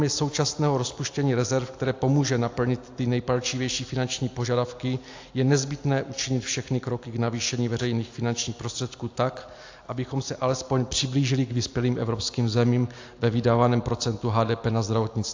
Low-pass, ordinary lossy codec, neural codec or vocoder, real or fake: 7.2 kHz; AAC, 48 kbps; none; real